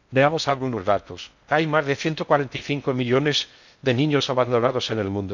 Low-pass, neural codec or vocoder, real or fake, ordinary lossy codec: 7.2 kHz; codec, 16 kHz in and 24 kHz out, 0.6 kbps, FocalCodec, streaming, 4096 codes; fake; none